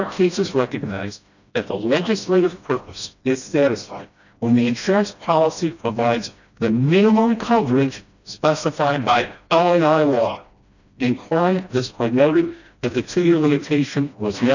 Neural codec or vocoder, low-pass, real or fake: codec, 16 kHz, 1 kbps, FreqCodec, smaller model; 7.2 kHz; fake